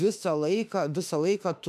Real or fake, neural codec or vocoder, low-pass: fake; autoencoder, 48 kHz, 32 numbers a frame, DAC-VAE, trained on Japanese speech; 14.4 kHz